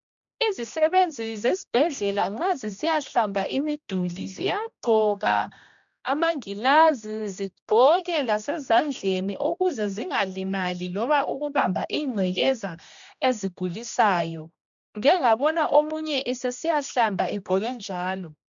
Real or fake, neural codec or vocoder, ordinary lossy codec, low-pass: fake; codec, 16 kHz, 1 kbps, X-Codec, HuBERT features, trained on general audio; MP3, 64 kbps; 7.2 kHz